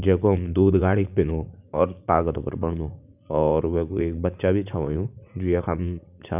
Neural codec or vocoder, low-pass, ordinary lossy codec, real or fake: vocoder, 44.1 kHz, 80 mel bands, Vocos; 3.6 kHz; none; fake